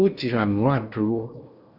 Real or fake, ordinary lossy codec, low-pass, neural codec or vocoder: fake; Opus, 64 kbps; 5.4 kHz; codec, 16 kHz in and 24 kHz out, 0.8 kbps, FocalCodec, streaming, 65536 codes